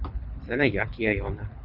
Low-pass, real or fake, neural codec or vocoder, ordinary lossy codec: 5.4 kHz; fake; codec, 24 kHz, 6 kbps, HILCodec; Opus, 64 kbps